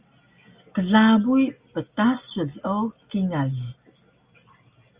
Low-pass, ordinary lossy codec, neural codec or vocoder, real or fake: 3.6 kHz; Opus, 64 kbps; none; real